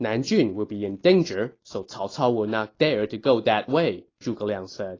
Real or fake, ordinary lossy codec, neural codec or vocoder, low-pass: real; AAC, 32 kbps; none; 7.2 kHz